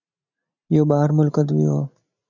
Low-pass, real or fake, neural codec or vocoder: 7.2 kHz; real; none